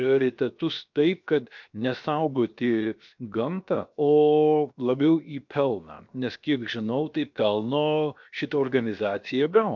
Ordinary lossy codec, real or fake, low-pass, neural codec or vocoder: MP3, 64 kbps; fake; 7.2 kHz; codec, 16 kHz, 0.7 kbps, FocalCodec